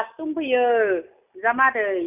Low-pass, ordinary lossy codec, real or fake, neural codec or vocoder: 3.6 kHz; none; real; none